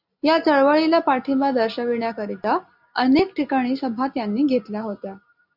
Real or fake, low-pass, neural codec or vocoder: real; 5.4 kHz; none